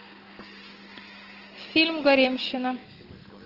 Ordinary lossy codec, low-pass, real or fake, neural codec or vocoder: Opus, 16 kbps; 5.4 kHz; real; none